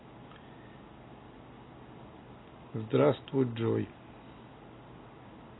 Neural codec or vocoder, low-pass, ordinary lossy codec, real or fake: none; 7.2 kHz; AAC, 16 kbps; real